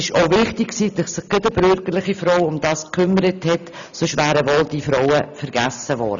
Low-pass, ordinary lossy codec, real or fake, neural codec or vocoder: 7.2 kHz; none; real; none